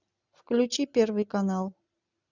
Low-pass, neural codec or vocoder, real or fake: 7.2 kHz; none; real